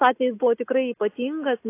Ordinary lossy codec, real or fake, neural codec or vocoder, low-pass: AAC, 24 kbps; real; none; 3.6 kHz